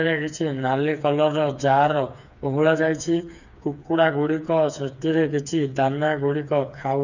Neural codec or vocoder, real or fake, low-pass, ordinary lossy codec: codec, 16 kHz, 4 kbps, FreqCodec, smaller model; fake; 7.2 kHz; none